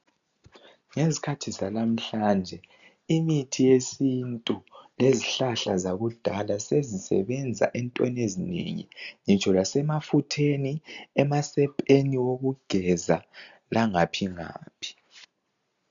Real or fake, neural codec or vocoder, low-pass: real; none; 7.2 kHz